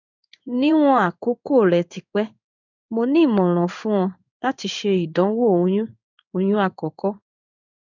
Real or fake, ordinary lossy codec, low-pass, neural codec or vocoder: fake; none; 7.2 kHz; codec, 16 kHz in and 24 kHz out, 1 kbps, XY-Tokenizer